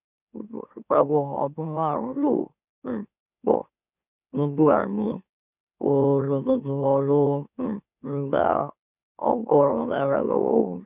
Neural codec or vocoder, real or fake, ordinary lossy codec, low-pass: autoencoder, 44.1 kHz, a latent of 192 numbers a frame, MeloTTS; fake; none; 3.6 kHz